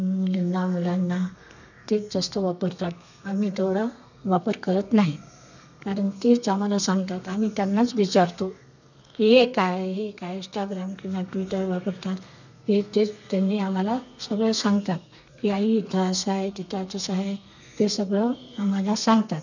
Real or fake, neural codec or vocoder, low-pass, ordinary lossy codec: fake; codec, 32 kHz, 1.9 kbps, SNAC; 7.2 kHz; none